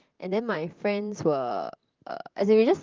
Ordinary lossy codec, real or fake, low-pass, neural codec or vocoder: Opus, 24 kbps; fake; 7.2 kHz; vocoder, 44.1 kHz, 128 mel bands, Pupu-Vocoder